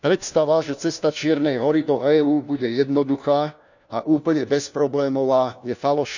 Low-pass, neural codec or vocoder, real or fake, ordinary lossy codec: 7.2 kHz; codec, 16 kHz, 1 kbps, FunCodec, trained on LibriTTS, 50 frames a second; fake; none